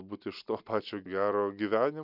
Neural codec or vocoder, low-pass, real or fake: none; 5.4 kHz; real